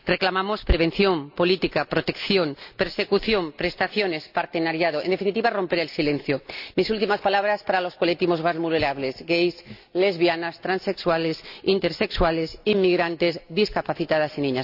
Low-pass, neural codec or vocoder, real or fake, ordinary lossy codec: 5.4 kHz; none; real; AAC, 48 kbps